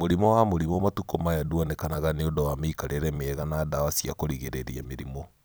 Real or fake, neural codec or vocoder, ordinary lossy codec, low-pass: fake; vocoder, 44.1 kHz, 128 mel bands every 256 samples, BigVGAN v2; none; none